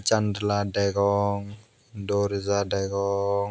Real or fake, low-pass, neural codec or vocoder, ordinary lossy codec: real; none; none; none